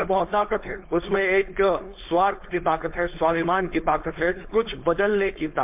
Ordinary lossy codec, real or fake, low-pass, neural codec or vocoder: MP3, 32 kbps; fake; 3.6 kHz; codec, 16 kHz, 4.8 kbps, FACodec